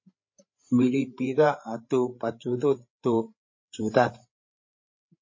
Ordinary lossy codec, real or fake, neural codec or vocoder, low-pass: MP3, 32 kbps; fake; codec, 16 kHz, 8 kbps, FreqCodec, larger model; 7.2 kHz